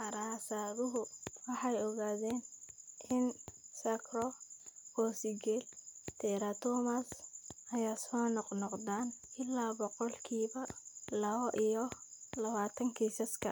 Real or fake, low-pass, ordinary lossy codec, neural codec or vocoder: real; none; none; none